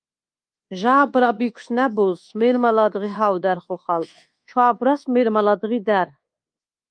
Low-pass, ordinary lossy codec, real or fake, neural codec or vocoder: 9.9 kHz; Opus, 32 kbps; fake; codec, 24 kHz, 1.2 kbps, DualCodec